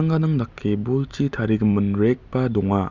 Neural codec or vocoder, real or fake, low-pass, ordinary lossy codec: none; real; 7.2 kHz; none